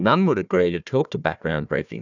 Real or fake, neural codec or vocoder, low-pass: fake; codec, 16 kHz, 1 kbps, FunCodec, trained on Chinese and English, 50 frames a second; 7.2 kHz